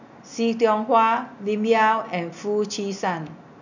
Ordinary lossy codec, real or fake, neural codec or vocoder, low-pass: none; real; none; 7.2 kHz